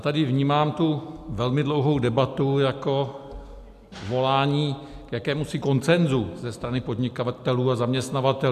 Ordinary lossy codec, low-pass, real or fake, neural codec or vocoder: AAC, 96 kbps; 14.4 kHz; real; none